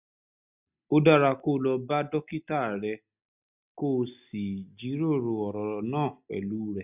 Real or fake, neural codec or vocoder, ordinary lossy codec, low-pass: real; none; none; 3.6 kHz